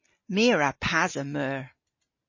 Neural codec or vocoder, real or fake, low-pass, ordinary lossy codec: none; real; 7.2 kHz; MP3, 32 kbps